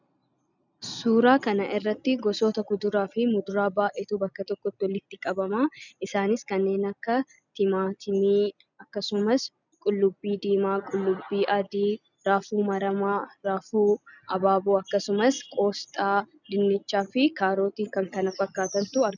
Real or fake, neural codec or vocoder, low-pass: real; none; 7.2 kHz